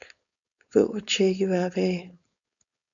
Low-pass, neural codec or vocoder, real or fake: 7.2 kHz; codec, 16 kHz, 4.8 kbps, FACodec; fake